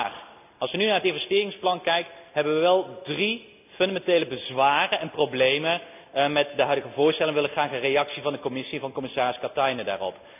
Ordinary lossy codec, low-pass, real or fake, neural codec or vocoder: none; 3.6 kHz; real; none